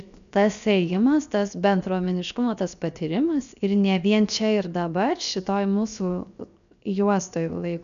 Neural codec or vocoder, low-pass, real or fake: codec, 16 kHz, 0.7 kbps, FocalCodec; 7.2 kHz; fake